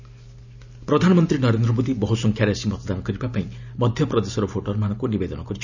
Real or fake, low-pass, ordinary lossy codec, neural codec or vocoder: real; 7.2 kHz; none; none